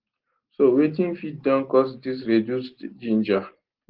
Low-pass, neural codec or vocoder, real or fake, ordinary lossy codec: 5.4 kHz; none; real; Opus, 16 kbps